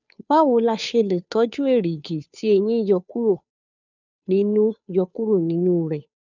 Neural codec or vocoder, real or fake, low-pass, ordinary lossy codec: codec, 16 kHz, 2 kbps, FunCodec, trained on Chinese and English, 25 frames a second; fake; 7.2 kHz; none